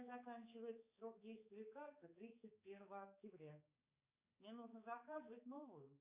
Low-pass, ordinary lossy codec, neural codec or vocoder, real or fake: 3.6 kHz; Opus, 64 kbps; codec, 16 kHz, 4 kbps, X-Codec, HuBERT features, trained on balanced general audio; fake